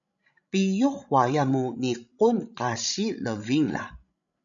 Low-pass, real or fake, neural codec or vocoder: 7.2 kHz; fake; codec, 16 kHz, 16 kbps, FreqCodec, larger model